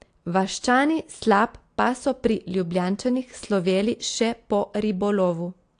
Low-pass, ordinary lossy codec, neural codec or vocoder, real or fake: 9.9 kHz; AAC, 48 kbps; none; real